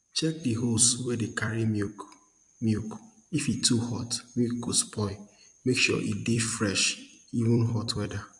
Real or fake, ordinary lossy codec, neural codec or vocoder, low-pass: fake; MP3, 64 kbps; vocoder, 44.1 kHz, 128 mel bands every 256 samples, BigVGAN v2; 10.8 kHz